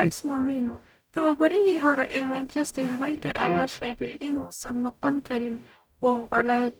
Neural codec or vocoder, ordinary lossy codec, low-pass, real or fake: codec, 44.1 kHz, 0.9 kbps, DAC; none; none; fake